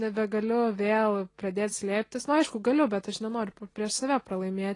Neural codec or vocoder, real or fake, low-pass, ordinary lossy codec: none; real; 10.8 kHz; AAC, 32 kbps